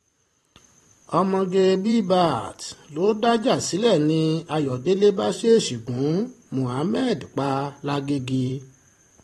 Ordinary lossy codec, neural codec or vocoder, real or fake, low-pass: AAC, 32 kbps; vocoder, 44.1 kHz, 128 mel bands every 512 samples, BigVGAN v2; fake; 19.8 kHz